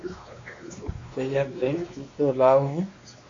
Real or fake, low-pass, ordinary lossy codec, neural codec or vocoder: fake; 7.2 kHz; AAC, 48 kbps; codec, 16 kHz, 2 kbps, X-Codec, WavLM features, trained on Multilingual LibriSpeech